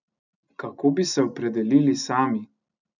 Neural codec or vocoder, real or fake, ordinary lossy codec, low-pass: none; real; none; 7.2 kHz